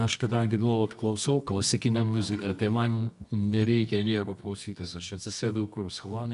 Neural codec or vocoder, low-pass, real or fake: codec, 24 kHz, 0.9 kbps, WavTokenizer, medium music audio release; 10.8 kHz; fake